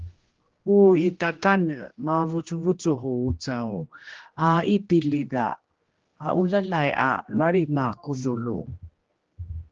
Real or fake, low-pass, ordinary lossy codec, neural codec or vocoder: fake; 7.2 kHz; Opus, 16 kbps; codec, 16 kHz, 1 kbps, X-Codec, HuBERT features, trained on general audio